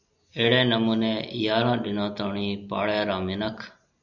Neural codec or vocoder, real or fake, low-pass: none; real; 7.2 kHz